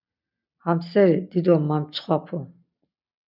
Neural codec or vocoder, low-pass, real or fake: none; 5.4 kHz; real